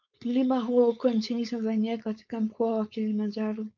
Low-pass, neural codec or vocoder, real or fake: 7.2 kHz; codec, 16 kHz, 4.8 kbps, FACodec; fake